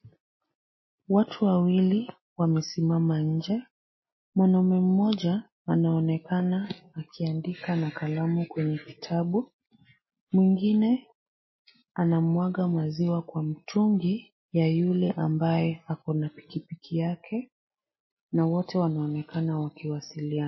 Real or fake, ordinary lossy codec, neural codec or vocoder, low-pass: real; MP3, 24 kbps; none; 7.2 kHz